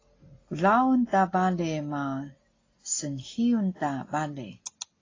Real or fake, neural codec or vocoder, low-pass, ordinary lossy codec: real; none; 7.2 kHz; AAC, 32 kbps